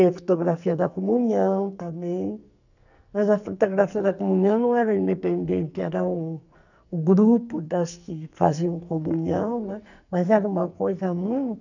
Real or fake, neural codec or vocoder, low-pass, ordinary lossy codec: fake; codec, 44.1 kHz, 2.6 kbps, SNAC; 7.2 kHz; none